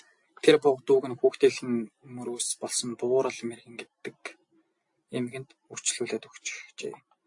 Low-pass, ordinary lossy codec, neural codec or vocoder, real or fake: 10.8 kHz; AAC, 64 kbps; none; real